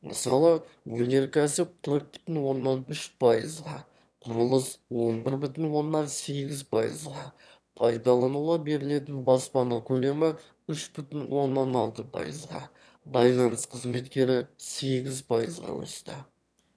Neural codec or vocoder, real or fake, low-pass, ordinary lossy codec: autoencoder, 22.05 kHz, a latent of 192 numbers a frame, VITS, trained on one speaker; fake; none; none